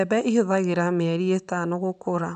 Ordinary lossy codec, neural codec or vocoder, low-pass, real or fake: none; none; 9.9 kHz; real